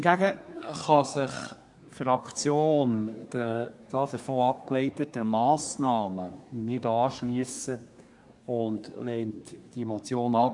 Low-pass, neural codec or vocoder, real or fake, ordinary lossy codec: 10.8 kHz; codec, 24 kHz, 1 kbps, SNAC; fake; none